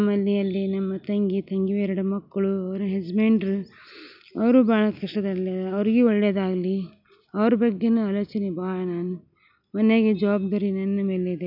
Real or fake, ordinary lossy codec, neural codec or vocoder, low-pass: real; none; none; 5.4 kHz